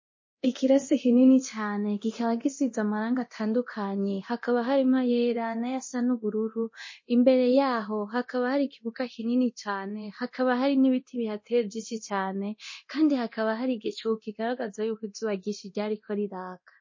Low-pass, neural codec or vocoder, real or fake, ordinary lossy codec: 7.2 kHz; codec, 24 kHz, 0.9 kbps, DualCodec; fake; MP3, 32 kbps